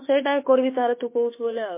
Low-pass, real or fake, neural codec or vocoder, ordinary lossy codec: 3.6 kHz; fake; autoencoder, 48 kHz, 32 numbers a frame, DAC-VAE, trained on Japanese speech; MP3, 24 kbps